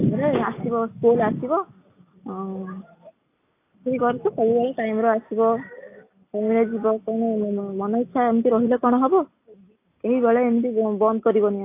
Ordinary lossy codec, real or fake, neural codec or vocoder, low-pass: AAC, 24 kbps; real; none; 3.6 kHz